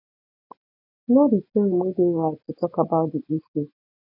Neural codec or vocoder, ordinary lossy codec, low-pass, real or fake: none; none; 5.4 kHz; real